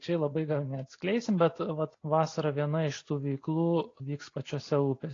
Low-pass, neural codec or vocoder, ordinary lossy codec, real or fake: 7.2 kHz; none; AAC, 32 kbps; real